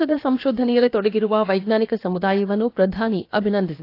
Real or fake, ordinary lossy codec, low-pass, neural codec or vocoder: fake; AAC, 32 kbps; 5.4 kHz; codec, 16 kHz, about 1 kbps, DyCAST, with the encoder's durations